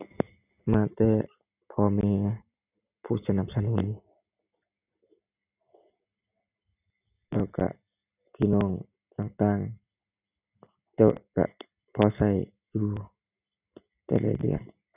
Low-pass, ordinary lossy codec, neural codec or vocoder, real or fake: 3.6 kHz; none; none; real